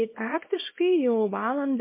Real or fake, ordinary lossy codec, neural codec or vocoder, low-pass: fake; MP3, 24 kbps; codec, 24 kHz, 0.9 kbps, WavTokenizer, small release; 3.6 kHz